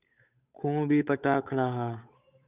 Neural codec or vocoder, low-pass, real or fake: codec, 16 kHz, 8 kbps, FunCodec, trained on Chinese and English, 25 frames a second; 3.6 kHz; fake